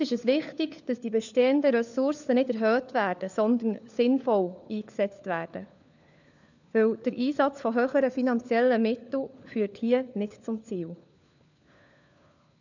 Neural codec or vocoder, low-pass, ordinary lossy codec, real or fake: codec, 16 kHz, 4 kbps, FunCodec, trained on LibriTTS, 50 frames a second; 7.2 kHz; none; fake